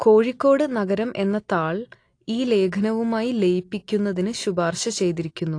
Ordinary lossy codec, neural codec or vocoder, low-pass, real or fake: AAC, 48 kbps; none; 9.9 kHz; real